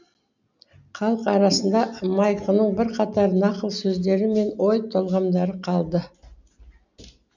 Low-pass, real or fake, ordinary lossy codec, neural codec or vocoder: 7.2 kHz; real; none; none